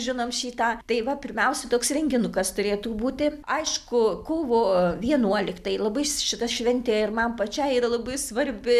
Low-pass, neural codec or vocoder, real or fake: 14.4 kHz; none; real